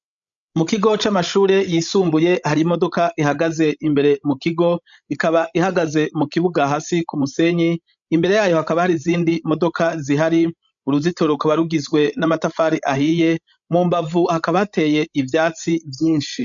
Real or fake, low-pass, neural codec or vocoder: fake; 7.2 kHz; codec, 16 kHz, 16 kbps, FreqCodec, larger model